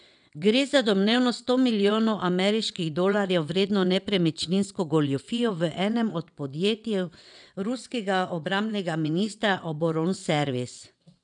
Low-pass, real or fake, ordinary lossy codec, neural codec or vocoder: 9.9 kHz; fake; none; vocoder, 22.05 kHz, 80 mel bands, WaveNeXt